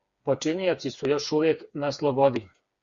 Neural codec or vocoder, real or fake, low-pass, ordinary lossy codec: codec, 16 kHz, 4 kbps, FreqCodec, smaller model; fake; 7.2 kHz; Opus, 64 kbps